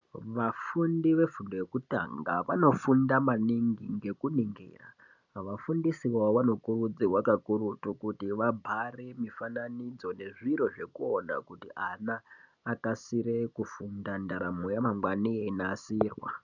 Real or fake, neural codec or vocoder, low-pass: real; none; 7.2 kHz